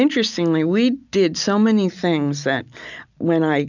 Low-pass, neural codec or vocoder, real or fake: 7.2 kHz; none; real